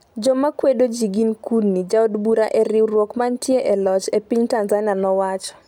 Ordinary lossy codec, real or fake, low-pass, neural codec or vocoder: none; real; 19.8 kHz; none